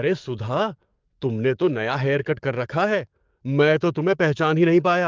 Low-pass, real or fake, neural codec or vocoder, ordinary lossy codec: 7.2 kHz; fake; codec, 44.1 kHz, 7.8 kbps, DAC; Opus, 32 kbps